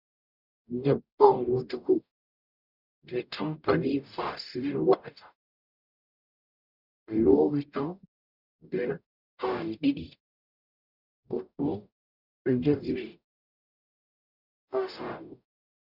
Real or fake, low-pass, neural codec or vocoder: fake; 5.4 kHz; codec, 44.1 kHz, 0.9 kbps, DAC